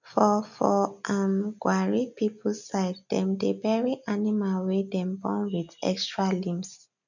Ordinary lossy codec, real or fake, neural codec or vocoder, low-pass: none; real; none; 7.2 kHz